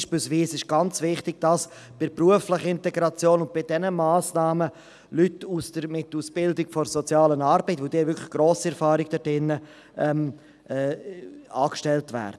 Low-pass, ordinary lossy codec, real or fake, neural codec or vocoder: none; none; real; none